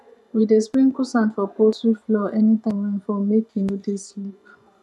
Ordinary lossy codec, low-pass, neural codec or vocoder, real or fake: none; none; vocoder, 24 kHz, 100 mel bands, Vocos; fake